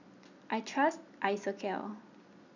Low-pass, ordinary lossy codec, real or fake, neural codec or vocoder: 7.2 kHz; none; real; none